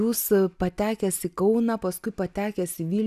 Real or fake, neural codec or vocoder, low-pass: real; none; 14.4 kHz